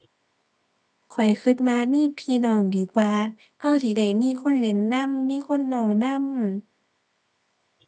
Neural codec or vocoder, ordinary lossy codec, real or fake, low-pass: codec, 24 kHz, 0.9 kbps, WavTokenizer, medium music audio release; none; fake; none